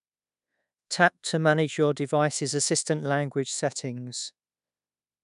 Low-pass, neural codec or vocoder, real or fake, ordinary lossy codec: 10.8 kHz; codec, 24 kHz, 1.2 kbps, DualCodec; fake; none